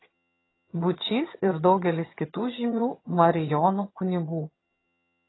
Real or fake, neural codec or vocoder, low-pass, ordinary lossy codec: fake; vocoder, 22.05 kHz, 80 mel bands, HiFi-GAN; 7.2 kHz; AAC, 16 kbps